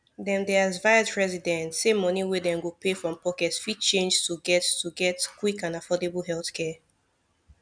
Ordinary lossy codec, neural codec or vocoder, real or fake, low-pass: none; none; real; 9.9 kHz